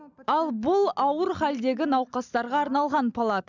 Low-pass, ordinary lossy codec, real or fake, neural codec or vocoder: 7.2 kHz; none; real; none